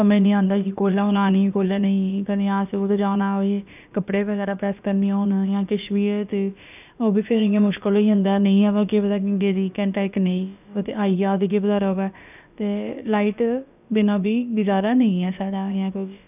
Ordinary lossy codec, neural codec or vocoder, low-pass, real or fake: none; codec, 16 kHz, about 1 kbps, DyCAST, with the encoder's durations; 3.6 kHz; fake